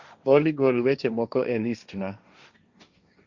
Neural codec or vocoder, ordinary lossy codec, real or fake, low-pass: codec, 16 kHz, 1.1 kbps, Voila-Tokenizer; Opus, 64 kbps; fake; 7.2 kHz